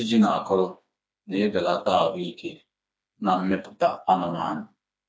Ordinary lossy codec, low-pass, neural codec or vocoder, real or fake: none; none; codec, 16 kHz, 2 kbps, FreqCodec, smaller model; fake